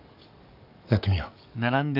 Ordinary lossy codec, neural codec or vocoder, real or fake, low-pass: none; codec, 44.1 kHz, 7.8 kbps, DAC; fake; 5.4 kHz